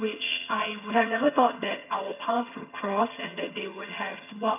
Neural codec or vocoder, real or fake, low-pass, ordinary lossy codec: vocoder, 22.05 kHz, 80 mel bands, HiFi-GAN; fake; 3.6 kHz; none